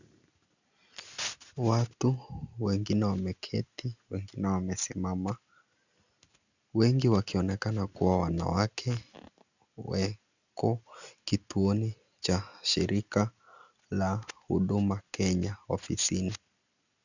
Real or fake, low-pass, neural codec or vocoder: real; 7.2 kHz; none